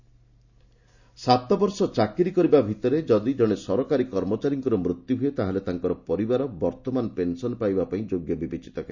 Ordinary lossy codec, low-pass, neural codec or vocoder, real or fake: none; 7.2 kHz; none; real